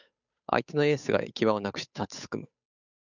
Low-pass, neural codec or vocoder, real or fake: 7.2 kHz; codec, 16 kHz, 8 kbps, FunCodec, trained on Chinese and English, 25 frames a second; fake